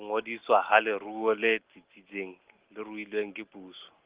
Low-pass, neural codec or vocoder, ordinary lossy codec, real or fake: 3.6 kHz; none; Opus, 16 kbps; real